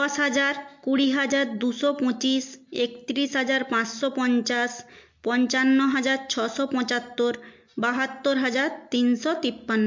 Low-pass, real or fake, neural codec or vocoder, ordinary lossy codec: 7.2 kHz; real; none; MP3, 48 kbps